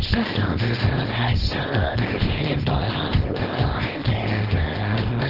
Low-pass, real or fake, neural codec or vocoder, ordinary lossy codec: 5.4 kHz; fake; codec, 24 kHz, 0.9 kbps, WavTokenizer, small release; Opus, 16 kbps